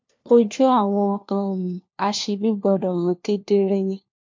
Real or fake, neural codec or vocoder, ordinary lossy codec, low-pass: fake; codec, 16 kHz, 1 kbps, FunCodec, trained on LibriTTS, 50 frames a second; MP3, 48 kbps; 7.2 kHz